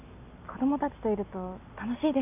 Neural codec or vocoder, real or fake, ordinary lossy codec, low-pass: none; real; none; 3.6 kHz